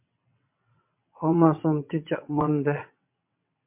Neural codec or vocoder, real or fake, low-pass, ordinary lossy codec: vocoder, 22.05 kHz, 80 mel bands, WaveNeXt; fake; 3.6 kHz; MP3, 32 kbps